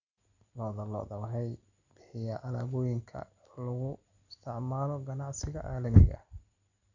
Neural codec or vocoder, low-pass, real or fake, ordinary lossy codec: none; 7.2 kHz; real; Opus, 64 kbps